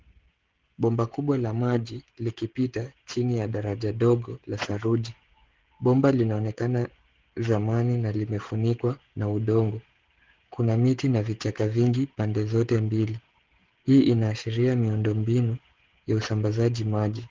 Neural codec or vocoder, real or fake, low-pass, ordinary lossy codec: codec, 16 kHz, 16 kbps, FreqCodec, smaller model; fake; 7.2 kHz; Opus, 16 kbps